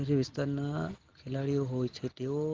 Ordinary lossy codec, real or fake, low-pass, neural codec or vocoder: Opus, 16 kbps; real; 7.2 kHz; none